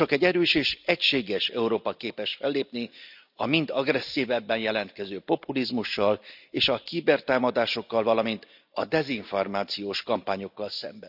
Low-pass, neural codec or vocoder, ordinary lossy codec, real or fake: 5.4 kHz; none; none; real